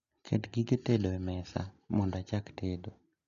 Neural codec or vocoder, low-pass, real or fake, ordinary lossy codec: none; 7.2 kHz; real; none